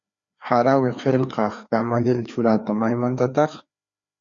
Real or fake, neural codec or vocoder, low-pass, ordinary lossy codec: fake; codec, 16 kHz, 2 kbps, FreqCodec, larger model; 7.2 kHz; Opus, 64 kbps